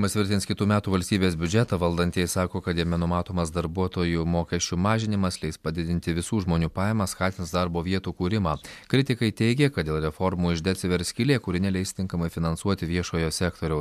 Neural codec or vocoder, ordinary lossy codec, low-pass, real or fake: none; MP3, 96 kbps; 14.4 kHz; real